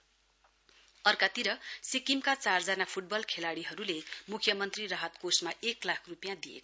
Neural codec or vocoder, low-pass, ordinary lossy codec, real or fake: none; none; none; real